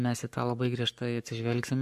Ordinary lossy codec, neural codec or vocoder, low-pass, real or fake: MP3, 64 kbps; codec, 44.1 kHz, 7.8 kbps, Pupu-Codec; 14.4 kHz; fake